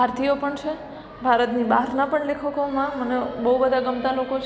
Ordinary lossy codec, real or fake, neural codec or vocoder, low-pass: none; real; none; none